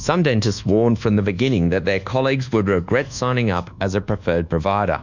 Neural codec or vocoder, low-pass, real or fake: codec, 24 kHz, 1.2 kbps, DualCodec; 7.2 kHz; fake